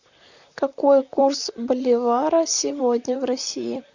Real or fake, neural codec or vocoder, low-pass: fake; vocoder, 44.1 kHz, 128 mel bands, Pupu-Vocoder; 7.2 kHz